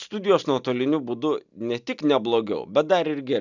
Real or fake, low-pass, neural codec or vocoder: real; 7.2 kHz; none